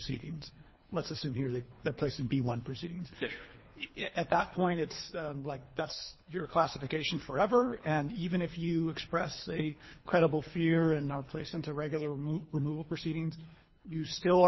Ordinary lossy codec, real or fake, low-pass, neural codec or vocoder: MP3, 24 kbps; fake; 7.2 kHz; codec, 24 kHz, 3 kbps, HILCodec